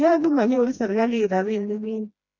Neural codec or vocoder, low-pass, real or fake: codec, 16 kHz, 1 kbps, FreqCodec, smaller model; 7.2 kHz; fake